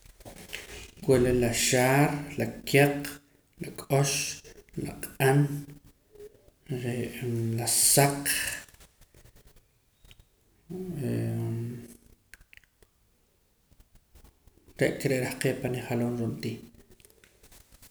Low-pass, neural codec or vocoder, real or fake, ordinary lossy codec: none; none; real; none